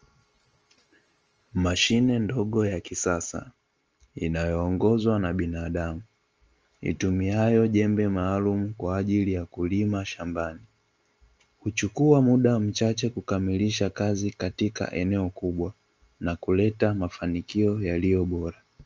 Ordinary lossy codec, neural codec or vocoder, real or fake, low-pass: Opus, 24 kbps; none; real; 7.2 kHz